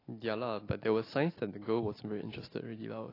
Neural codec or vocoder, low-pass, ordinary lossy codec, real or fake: none; 5.4 kHz; AAC, 24 kbps; real